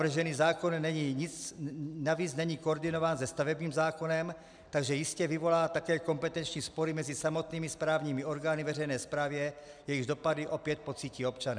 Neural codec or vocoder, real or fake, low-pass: none; real; 9.9 kHz